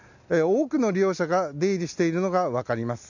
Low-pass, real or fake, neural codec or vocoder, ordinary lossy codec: 7.2 kHz; real; none; none